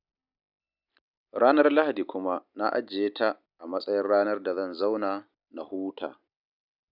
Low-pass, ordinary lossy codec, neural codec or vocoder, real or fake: 5.4 kHz; none; none; real